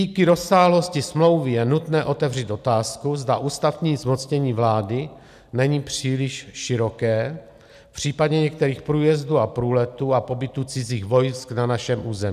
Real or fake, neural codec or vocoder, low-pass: real; none; 14.4 kHz